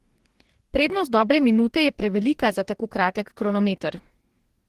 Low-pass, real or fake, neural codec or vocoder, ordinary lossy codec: 14.4 kHz; fake; codec, 44.1 kHz, 2.6 kbps, DAC; Opus, 16 kbps